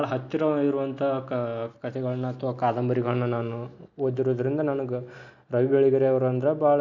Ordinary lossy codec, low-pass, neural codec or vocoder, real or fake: none; 7.2 kHz; none; real